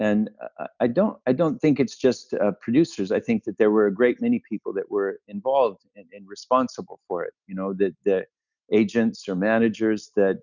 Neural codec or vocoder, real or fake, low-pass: none; real; 7.2 kHz